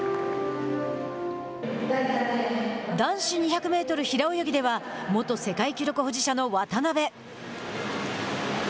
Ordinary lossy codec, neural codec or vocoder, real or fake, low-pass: none; none; real; none